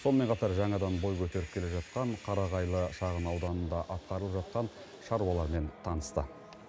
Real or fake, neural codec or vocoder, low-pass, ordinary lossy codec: real; none; none; none